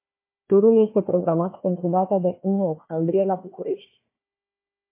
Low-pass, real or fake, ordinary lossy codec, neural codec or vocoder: 3.6 kHz; fake; MP3, 24 kbps; codec, 16 kHz, 1 kbps, FunCodec, trained on Chinese and English, 50 frames a second